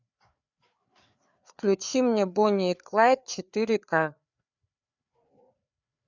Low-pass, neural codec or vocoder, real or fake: 7.2 kHz; codec, 16 kHz, 4 kbps, FreqCodec, larger model; fake